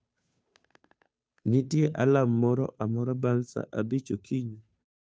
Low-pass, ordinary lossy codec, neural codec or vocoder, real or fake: none; none; codec, 16 kHz, 2 kbps, FunCodec, trained on Chinese and English, 25 frames a second; fake